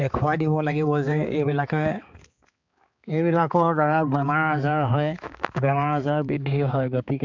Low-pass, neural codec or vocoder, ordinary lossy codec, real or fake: 7.2 kHz; codec, 16 kHz, 4 kbps, X-Codec, HuBERT features, trained on balanced general audio; MP3, 64 kbps; fake